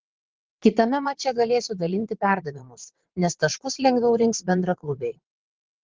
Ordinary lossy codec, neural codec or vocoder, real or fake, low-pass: Opus, 16 kbps; vocoder, 22.05 kHz, 80 mel bands, WaveNeXt; fake; 7.2 kHz